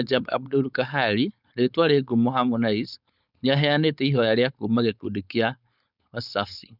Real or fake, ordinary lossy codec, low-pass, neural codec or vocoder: fake; none; 5.4 kHz; codec, 16 kHz, 4.8 kbps, FACodec